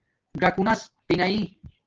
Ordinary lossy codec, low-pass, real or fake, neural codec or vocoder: Opus, 16 kbps; 7.2 kHz; real; none